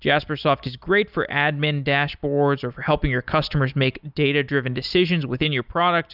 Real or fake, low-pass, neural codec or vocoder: real; 5.4 kHz; none